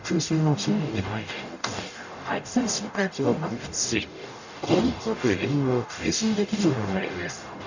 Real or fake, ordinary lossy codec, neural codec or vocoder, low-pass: fake; none; codec, 44.1 kHz, 0.9 kbps, DAC; 7.2 kHz